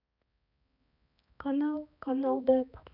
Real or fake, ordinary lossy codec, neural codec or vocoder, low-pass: fake; none; codec, 16 kHz, 2 kbps, X-Codec, HuBERT features, trained on balanced general audio; 5.4 kHz